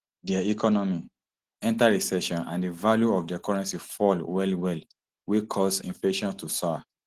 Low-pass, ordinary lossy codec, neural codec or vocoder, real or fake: 14.4 kHz; Opus, 16 kbps; none; real